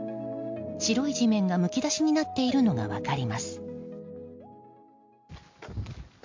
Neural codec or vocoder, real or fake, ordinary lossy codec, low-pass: none; real; MP3, 48 kbps; 7.2 kHz